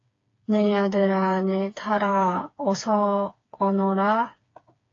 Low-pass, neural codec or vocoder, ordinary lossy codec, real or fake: 7.2 kHz; codec, 16 kHz, 4 kbps, FreqCodec, smaller model; AAC, 32 kbps; fake